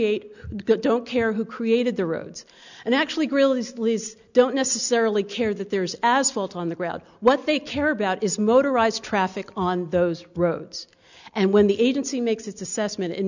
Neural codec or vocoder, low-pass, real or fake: none; 7.2 kHz; real